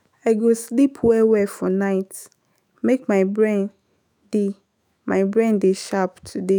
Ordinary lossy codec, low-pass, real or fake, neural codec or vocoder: none; none; fake; autoencoder, 48 kHz, 128 numbers a frame, DAC-VAE, trained on Japanese speech